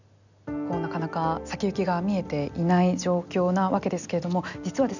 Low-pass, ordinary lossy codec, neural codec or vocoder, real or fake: 7.2 kHz; none; none; real